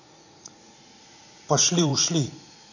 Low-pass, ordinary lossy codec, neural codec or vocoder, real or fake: 7.2 kHz; none; vocoder, 44.1 kHz, 80 mel bands, Vocos; fake